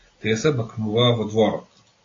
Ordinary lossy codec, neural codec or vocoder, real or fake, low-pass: AAC, 32 kbps; none; real; 7.2 kHz